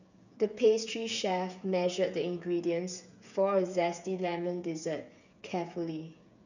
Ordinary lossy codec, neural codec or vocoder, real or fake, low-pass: none; codec, 16 kHz, 8 kbps, FreqCodec, smaller model; fake; 7.2 kHz